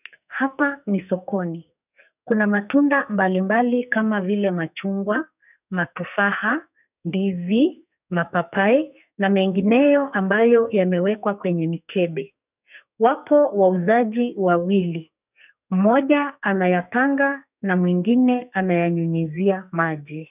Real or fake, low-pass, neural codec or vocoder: fake; 3.6 kHz; codec, 44.1 kHz, 2.6 kbps, SNAC